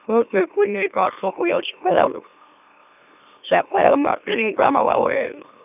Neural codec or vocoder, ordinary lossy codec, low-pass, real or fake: autoencoder, 44.1 kHz, a latent of 192 numbers a frame, MeloTTS; none; 3.6 kHz; fake